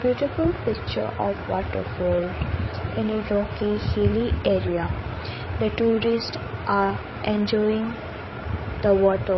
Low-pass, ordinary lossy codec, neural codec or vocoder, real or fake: 7.2 kHz; MP3, 24 kbps; codec, 16 kHz, 8 kbps, FreqCodec, larger model; fake